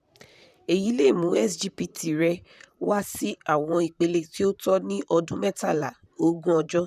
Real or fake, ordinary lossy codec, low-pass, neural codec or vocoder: fake; none; 14.4 kHz; vocoder, 44.1 kHz, 128 mel bands, Pupu-Vocoder